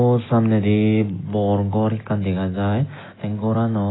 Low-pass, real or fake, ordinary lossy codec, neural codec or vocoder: 7.2 kHz; real; AAC, 16 kbps; none